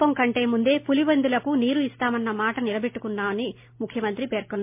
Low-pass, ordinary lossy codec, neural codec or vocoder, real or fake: 3.6 kHz; MP3, 32 kbps; none; real